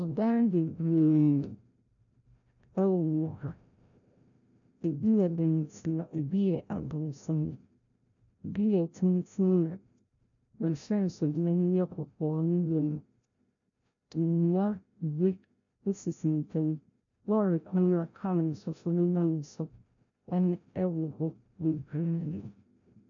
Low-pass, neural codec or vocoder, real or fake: 7.2 kHz; codec, 16 kHz, 0.5 kbps, FreqCodec, larger model; fake